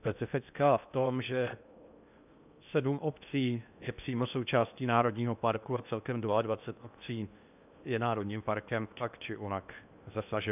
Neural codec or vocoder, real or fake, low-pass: codec, 16 kHz in and 24 kHz out, 0.8 kbps, FocalCodec, streaming, 65536 codes; fake; 3.6 kHz